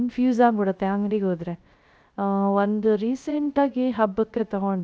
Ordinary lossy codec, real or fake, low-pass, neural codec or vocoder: none; fake; none; codec, 16 kHz, 0.3 kbps, FocalCodec